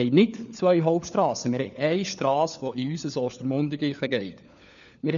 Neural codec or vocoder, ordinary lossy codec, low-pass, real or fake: codec, 16 kHz, 4 kbps, FunCodec, trained on LibriTTS, 50 frames a second; none; 7.2 kHz; fake